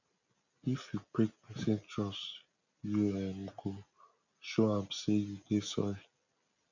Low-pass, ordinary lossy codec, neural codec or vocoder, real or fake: 7.2 kHz; none; none; real